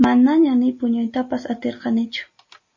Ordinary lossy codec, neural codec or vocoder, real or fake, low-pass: MP3, 32 kbps; none; real; 7.2 kHz